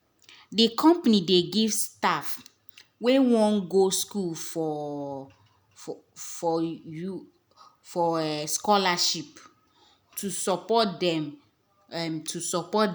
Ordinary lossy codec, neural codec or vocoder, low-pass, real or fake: none; none; none; real